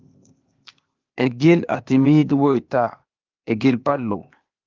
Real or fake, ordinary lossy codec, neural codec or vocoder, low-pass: fake; Opus, 24 kbps; codec, 16 kHz, 0.8 kbps, ZipCodec; 7.2 kHz